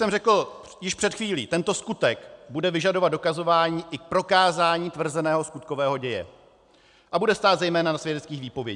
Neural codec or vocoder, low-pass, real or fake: none; 10.8 kHz; real